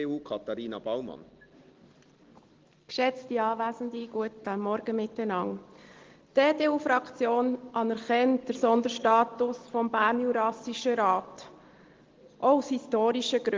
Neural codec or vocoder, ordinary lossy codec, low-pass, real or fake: none; Opus, 16 kbps; 7.2 kHz; real